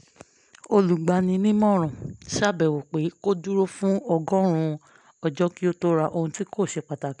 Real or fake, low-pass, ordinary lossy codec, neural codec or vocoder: real; 10.8 kHz; none; none